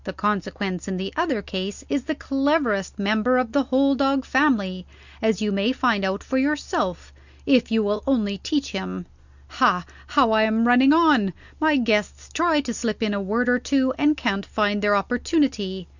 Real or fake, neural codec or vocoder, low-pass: real; none; 7.2 kHz